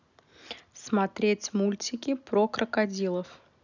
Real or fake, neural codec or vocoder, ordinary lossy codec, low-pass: real; none; none; 7.2 kHz